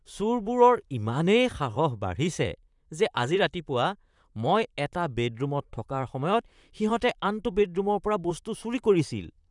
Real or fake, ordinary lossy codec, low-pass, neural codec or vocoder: fake; none; 10.8 kHz; vocoder, 44.1 kHz, 128 mel bands, Pupu-Vocoder